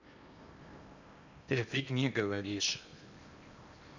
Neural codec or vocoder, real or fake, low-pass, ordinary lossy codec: codec, 16 kHz in and 24 kHz out, 0.8 kbps, FocalCodec, streaming, 65536 codes; fake; 7.2 kHz; none